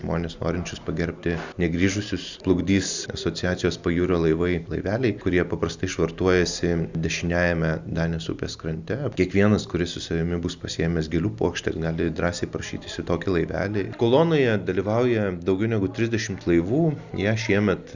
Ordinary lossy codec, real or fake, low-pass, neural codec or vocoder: Opus, 64 kbps; real; 7.2 kHz; none